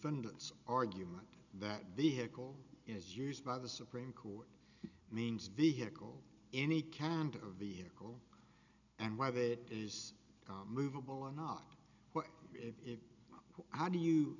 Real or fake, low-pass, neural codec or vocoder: real; 7.2 kHz; none